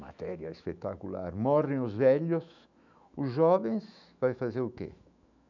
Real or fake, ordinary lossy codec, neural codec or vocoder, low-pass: fake; none; autoencoder, 48 kHz, 128 numbers a frame, DAC-VAE, trained on Japanese speech; 7.2 kHz